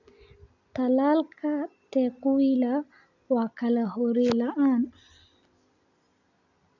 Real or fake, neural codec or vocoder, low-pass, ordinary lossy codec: real; none; 7.2 kHz; none